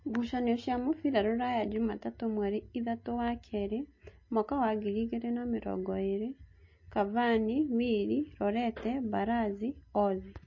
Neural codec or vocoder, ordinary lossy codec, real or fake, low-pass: none; MP3, 32 kbps; real; 7.2 kHz